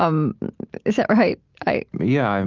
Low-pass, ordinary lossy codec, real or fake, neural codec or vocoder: 7.2 kHz; Opus, 24 kbps; real; none